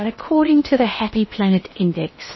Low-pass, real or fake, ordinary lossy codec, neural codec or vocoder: 7.2 kHz; fake; MP3, 24 kbps; codec, 16 kHz in and 24 kHz out, 0.8 kbps, FocalCodec, streaming, 65536 codes